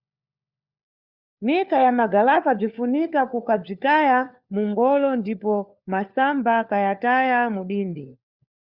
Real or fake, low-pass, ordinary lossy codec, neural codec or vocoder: fake; 5.4 kHz; Opus, 64 kbps; codec, 16 kHz, 4 kbps, FunCodec, trained on LibriTTS, 50 frames a second